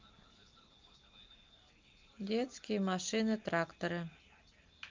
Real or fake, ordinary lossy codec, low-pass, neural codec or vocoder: real; Opus, 24 kbps; 7.2 kHz; none